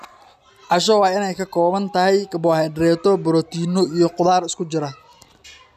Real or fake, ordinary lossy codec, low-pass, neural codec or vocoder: real; none; 14.4 kHz; none